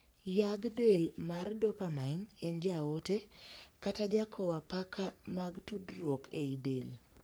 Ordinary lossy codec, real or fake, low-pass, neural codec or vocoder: none; fake; none; codec, 44.1 kHz, 3.4 kbps, Pupu-Codec